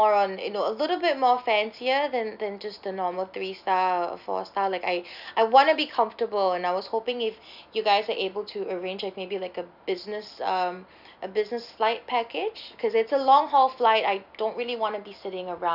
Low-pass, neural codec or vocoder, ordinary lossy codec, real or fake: 5.4 kHz; none; none; real